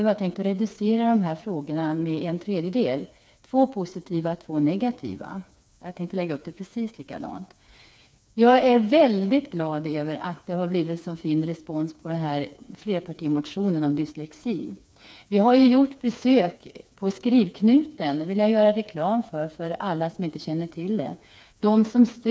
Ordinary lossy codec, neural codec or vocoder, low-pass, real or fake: none; codec, 16 kHz, 4 kbps, FreqCodec, smaller model; none; fake